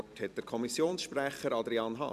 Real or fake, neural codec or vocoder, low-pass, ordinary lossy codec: fake; vocoder, 44.1 kHz, 128 mel bands every 256 samples, BigVGAN v2; 14.4 kHz; none